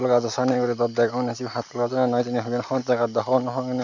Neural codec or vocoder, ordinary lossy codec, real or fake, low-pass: none; none; real; 7.2 kHz